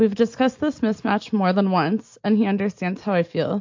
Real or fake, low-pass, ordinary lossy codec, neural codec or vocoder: real; 7.2 kHz; MP3, 48 kbps; none